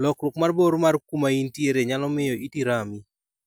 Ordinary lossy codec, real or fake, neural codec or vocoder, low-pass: none; real; none; none